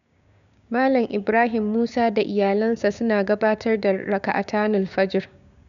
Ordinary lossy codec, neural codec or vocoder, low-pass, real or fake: MP3, 96 kbps; codec, 16 kHz, 6 kbps, DAC; 7.2 kHz; fake